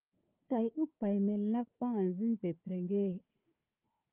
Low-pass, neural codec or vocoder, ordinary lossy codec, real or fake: 3.6 kHz; codec, 16 kHz, 4 kbps, FunCodec, trained on Chinese and English, 50 frames a second; Opus, 32 kbps; fake